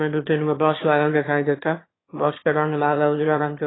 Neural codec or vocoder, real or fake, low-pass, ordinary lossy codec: autoencoder, 22.05 kHz, a latent of 192 numbers a frame, VITS, trained on one speaker; fake; 7.2 kHz; AAC, 16 kbps